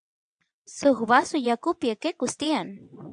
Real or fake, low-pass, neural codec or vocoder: fake; 9.9 kHz; vocoder, 22.05 kHz, 80 mel bands, WaveNeXt